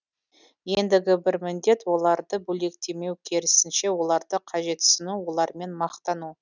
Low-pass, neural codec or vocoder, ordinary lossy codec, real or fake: 7.2 kHz; none; none; real